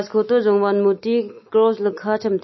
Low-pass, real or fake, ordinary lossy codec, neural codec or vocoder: 7.2 kHz; real; MP3, 24 kbps; none